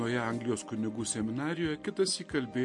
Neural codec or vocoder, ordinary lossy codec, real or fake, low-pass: none; MP3, 48 kbps; real; 10.8 kHz